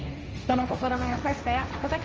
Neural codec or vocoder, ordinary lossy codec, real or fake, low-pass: codec, 16 kHz, 1.1 kbps, Voila-Tokenizer; Opus, 24 kbps; fake; 7.2 kHz